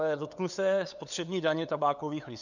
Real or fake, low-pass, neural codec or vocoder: fake; 7.2 kHz; codec, 16 kHz, 8 kbps, FunCodec, trained on LibriTTS, 25 frames a second